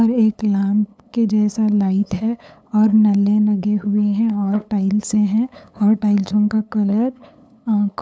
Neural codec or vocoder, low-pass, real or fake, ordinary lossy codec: codec, 16 kHz, 8 kbps, FunCodec, trained on LibriTTS, 25 frames a second; none; fake; none